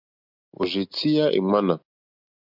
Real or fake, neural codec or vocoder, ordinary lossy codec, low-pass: real; none; MP3, 48 kbps; 5.4 kHz